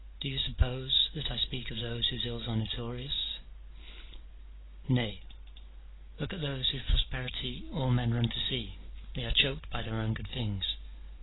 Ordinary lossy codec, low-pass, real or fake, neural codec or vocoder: AAC, 16 kbps; 7.2 kHz; real; none